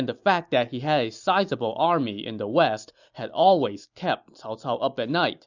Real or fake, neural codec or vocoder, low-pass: real; none; 7.2 kHz